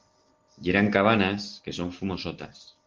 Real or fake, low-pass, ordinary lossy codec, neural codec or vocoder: real; 7.2 kHz; Opus, 16 kbps; none